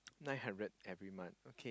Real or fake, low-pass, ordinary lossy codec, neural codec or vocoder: real; none; none; none